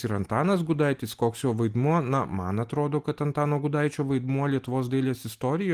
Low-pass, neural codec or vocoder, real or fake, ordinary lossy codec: 14.4 kHz; none; real; Opus, 24 kbps